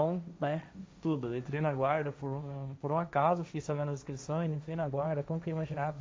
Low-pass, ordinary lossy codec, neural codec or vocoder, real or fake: none; none; codec, 16 kHz, 1.1 kbps, Voila-Tokenizer; fake